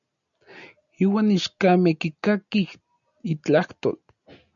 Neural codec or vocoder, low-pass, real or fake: none; 7.2 kHz; real